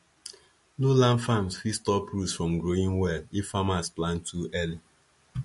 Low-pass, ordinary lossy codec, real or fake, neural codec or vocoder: 14.4 kHz; MP3, 48 kbps; real; none